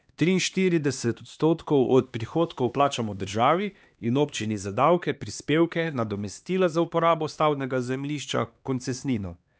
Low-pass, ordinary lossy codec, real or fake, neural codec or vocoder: none; none; fake; codec, 16 kHz, 2 kbps, X-Codec, HuBERT features, trained on LibriSpeech